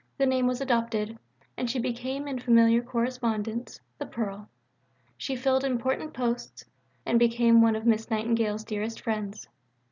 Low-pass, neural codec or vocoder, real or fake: 7.2 kHz; none; real